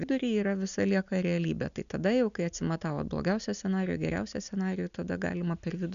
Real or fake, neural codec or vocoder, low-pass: real; none; 7.2 kHz